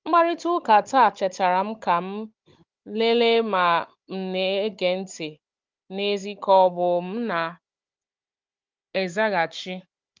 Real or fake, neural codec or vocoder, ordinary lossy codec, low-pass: fake; codec, 16 kHz, 16 kbps, FunCodec, trained on Chinese and English, 50 frames a second; Opus, 24 kbps; 7.2 kHz